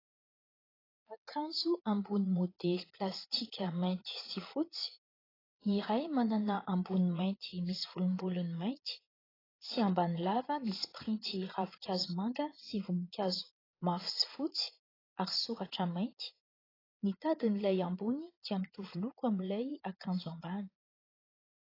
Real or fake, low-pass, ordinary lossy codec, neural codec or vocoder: fake; 5.4 kHz; AAC, 24 kbps; vocoder, 22.05 kHz, 80 mel bands, Vocos